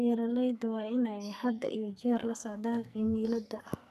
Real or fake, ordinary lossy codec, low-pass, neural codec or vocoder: fake; none; 14.4 kHz; codec, 44.1 kHz, 2.6 kbps, SNAC